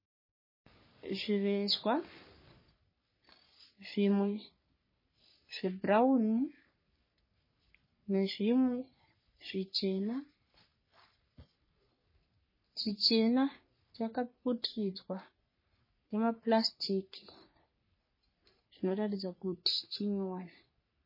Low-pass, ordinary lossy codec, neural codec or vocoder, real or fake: 5.4 kHz; MP3, 24 kbps; codec, 44.1 kHz, 3.4 kbps, Pupu-Codec; fake